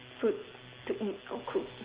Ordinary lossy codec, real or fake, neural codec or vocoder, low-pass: Opus, 16 kbps; real; none; 3.6 kHz